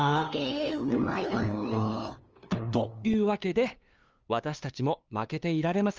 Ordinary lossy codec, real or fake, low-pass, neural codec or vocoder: Opus, 24 kbps; fake; 7.2 kHz; codec, 16 kHz, 2 kbps, FunCodec, trained on LibriTTS, 25 frames a second